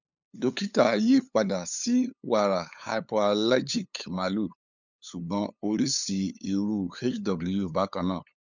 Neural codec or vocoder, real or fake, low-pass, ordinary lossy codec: codec, 16 kHz, 8 kbps, FunCodec, trained on LibriTTS, 25 frames a second; fake; 7.2 kHz; none